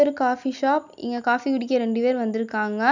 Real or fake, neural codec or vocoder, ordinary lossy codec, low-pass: real; none; none; 7.2 kHz